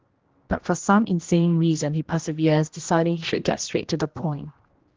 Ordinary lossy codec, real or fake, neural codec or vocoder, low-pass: Opus, 16 kbps; fake; codec, 16 kHz, 1 kbps, X-Codec, HuBERT features, trained on general audio; 7.2 kHz